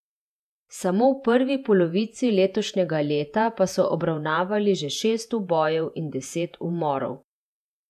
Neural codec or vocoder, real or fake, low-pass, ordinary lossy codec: vocoder, 48 kHz, 128 mel bands, Vocos; fake; 14.4 kHz; none